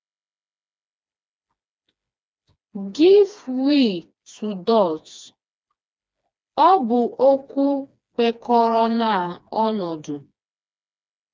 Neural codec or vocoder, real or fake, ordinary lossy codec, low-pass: codec, 16 kHz, 2 kbps, FreqCodec, smaller model; fake; none; none